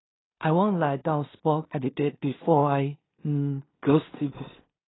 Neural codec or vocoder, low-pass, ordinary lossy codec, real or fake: codec, 16 kHz in and 24 kHz out, 0.4 kbps, LongCat-Audio-Codec, two codebook decoder; 7.2 kHz; AAC, 16 kbps; fake